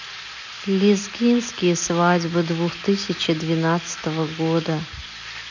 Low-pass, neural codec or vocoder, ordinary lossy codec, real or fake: 7.2 kHz; none; none; real